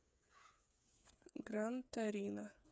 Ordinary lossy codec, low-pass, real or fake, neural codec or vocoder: none; none; fake; codec, 16 kHz, 8 kbps, FreqCodec, smaller model